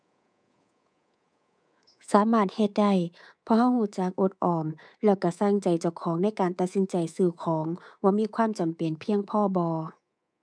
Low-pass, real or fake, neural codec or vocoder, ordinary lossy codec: 9.9 kHz; fake; codec, 24 kHz, 3.1 kbps, DualCodec; none